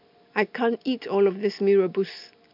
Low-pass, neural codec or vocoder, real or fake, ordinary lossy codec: 5.4 kHz; none; real; MP3, 48 kbps